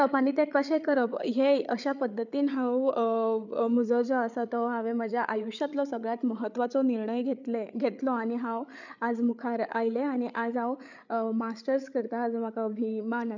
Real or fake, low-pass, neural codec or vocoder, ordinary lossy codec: fake; 7.2 kHz; codec, 16 kHz, 8 kbps, FreqCodec, larger model; none